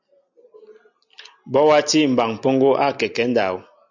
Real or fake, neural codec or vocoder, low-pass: real; none; 7.2 kHz